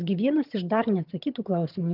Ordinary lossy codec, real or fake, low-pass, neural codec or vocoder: Opus, 32 kbps; fake; 5.4 kHz; vocoder, 22.05 kHz, 80 mel bands, HiFi-GAN